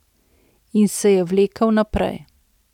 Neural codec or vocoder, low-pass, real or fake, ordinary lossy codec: none; 19.8 kHz; real; none